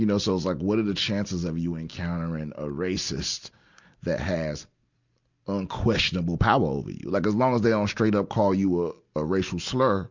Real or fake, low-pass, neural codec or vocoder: real; 7.2 kHz; none